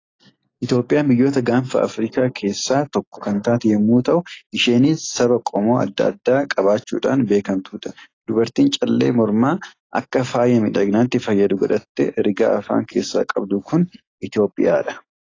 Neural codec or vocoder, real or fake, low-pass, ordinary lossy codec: none; real; 7.2 kHz; AAC, 32 kbps